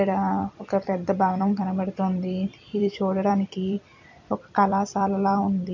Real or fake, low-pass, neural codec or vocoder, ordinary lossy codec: fake; 7.2 kHz; vocoder, 44.1 kHz, 128 mel bands every 256 samples, BigVGAN v2; MP3, 64 kbps